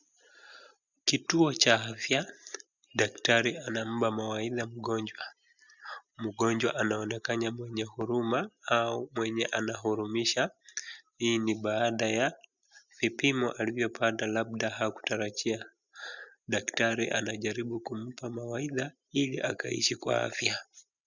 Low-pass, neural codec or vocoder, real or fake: 7.2 kHz; none; real